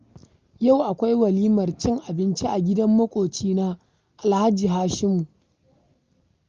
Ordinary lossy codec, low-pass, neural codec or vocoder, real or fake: Opus, 32 kbps; 7.2 kHz; none; real